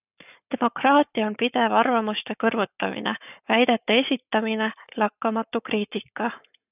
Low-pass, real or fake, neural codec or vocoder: 3.6 kHz; fake; codec, 44.1 kHz, 7.8 kbps, Pupu-Codec